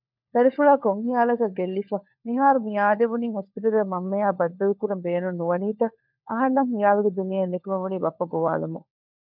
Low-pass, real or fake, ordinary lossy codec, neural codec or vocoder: 5.4 kHz; fake; MP3, 48 kbps; codec, 16 kHz, 4 kbps, FunCodec, trained on LibriTTS, 50 frames a second